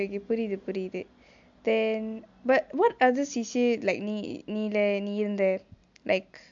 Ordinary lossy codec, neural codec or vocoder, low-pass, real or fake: MP3, 64 kbps; none; 7.2 kHz; real